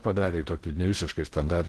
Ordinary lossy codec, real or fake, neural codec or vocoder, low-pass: Opus, 16 kbps; fake; codec, 16 kHz in and 24 kHz out, 0.6 kbps, FocalCodec, streaming, 2048 codes; 10.8 kHz